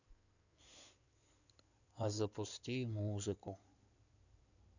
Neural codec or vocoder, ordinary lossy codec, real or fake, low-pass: codec, 16 kHz in and 24 kHz out, 2.2 kbps, FireRedTTS-2 codec; none; fake; 7.2 kHz